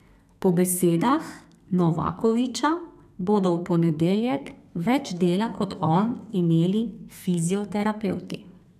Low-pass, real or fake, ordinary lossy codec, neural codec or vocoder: 14.4 kHz; fake; none; codec, 32 kHz, 1.9 kbps, SNAC